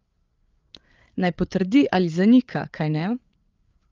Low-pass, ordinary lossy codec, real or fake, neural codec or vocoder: 7.2 kHz; Opus, 32 kbps; fake; codec, 16 kHz, 8 kbps, FreqCodec, larger model